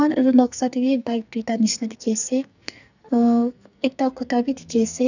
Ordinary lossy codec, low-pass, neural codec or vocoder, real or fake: none; 7.2 kHz; codec, 44.1 kHz, 2.6 kbps, SNAC; fake